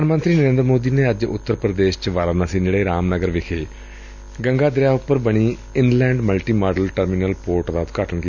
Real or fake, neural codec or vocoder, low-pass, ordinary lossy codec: real; none; 7.2 kHz; none